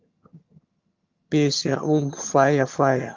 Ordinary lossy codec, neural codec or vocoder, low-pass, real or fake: Opus, 32 kbps; vocoder, 22.05 kHz, 80 mel bands, HiFi-GAN; 7.2 kHz; fake